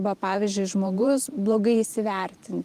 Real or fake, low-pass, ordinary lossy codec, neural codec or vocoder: fake; 14.4 kHz; Opus, 24 kbps; vocoder, 44.1 kHz, 128 mel bands every 512 samples, BigVGAN v2